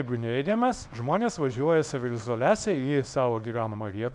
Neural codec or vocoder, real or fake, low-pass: codec, 24 kHz, 0.9 kbps, WavTokenizer, small release; fake; 10.8 kHz